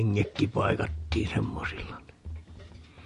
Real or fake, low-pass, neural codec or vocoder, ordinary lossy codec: real; 14.4 kHz; none; MP3, 48 kbps